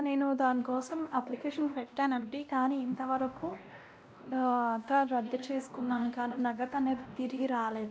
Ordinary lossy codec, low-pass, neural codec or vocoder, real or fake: none; none; codec, 16 kHz, 1 kbps, X-Codec, WavLM features, trained on Multilingual LibriSpeech; fake